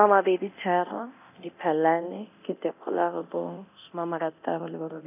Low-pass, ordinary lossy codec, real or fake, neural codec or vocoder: 3.6 kHz; none; fake; codec, 24 kHz, 0.9 kbps, DualCodec